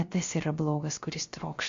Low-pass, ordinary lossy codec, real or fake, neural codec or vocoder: 7.2 kHz; MP3, 48 kbps; fake; codec, 16 kHz, 0.3 kbps, FocalCodec